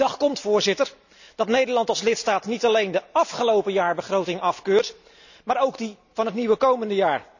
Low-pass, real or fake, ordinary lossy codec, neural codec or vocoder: 7.2 kHz; real; none; none